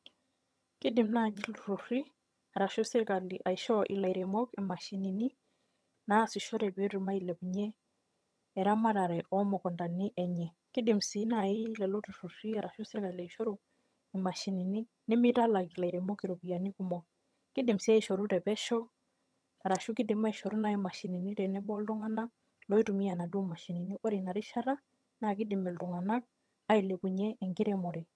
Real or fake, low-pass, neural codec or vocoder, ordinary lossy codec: fake; none; vocoder, 22.05 kHz, 80 mel bands, HiFi-GAN; none